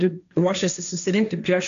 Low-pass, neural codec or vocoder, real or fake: 7.2 kHz; codec, 16 kHz, 1.1 kbps, Voila-Tokenizer; fake